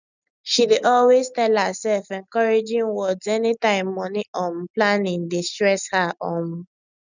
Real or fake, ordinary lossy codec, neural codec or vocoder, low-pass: real; none; none; 7.2 kHz